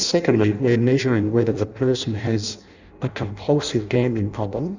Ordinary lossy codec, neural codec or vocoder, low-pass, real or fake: Opus, 64 kbps; codec, 16 kHz in and 24 kHz out, 0.6 kbps, FireRedTTS-2 codec; 7.2 kHz; fake